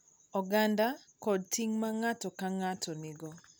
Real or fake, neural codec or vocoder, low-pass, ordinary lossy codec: real; none; none; none